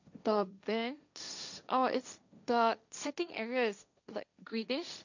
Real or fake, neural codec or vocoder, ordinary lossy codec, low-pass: fake; codec, 16 kHz, 1.1 kbps, Voila-Tokenizer; none; 7.2 kHz